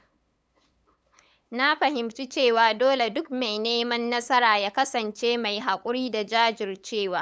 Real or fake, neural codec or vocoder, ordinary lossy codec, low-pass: fake; codec, 16 kHz, 8 kbps, FunCodec, trained on LibriTTS, 25 frames a second; none; none